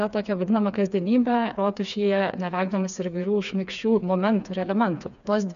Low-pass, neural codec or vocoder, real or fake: 7.2 kHz; codec, 16 kHz, 4 kbps, FreqCodec, smaller model; fake